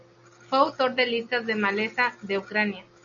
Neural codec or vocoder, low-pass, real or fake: none; 7.2 kHz; real